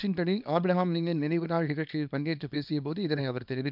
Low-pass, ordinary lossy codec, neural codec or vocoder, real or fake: 5.4 kHz; none; codec, 24 kHz, 0.9 kbps, WavTokenizer, small release; fake